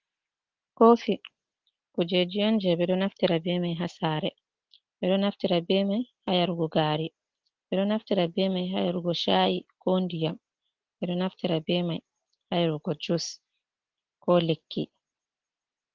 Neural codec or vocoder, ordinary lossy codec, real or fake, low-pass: none; Opus, 16 kbps; real; 7.2 kHz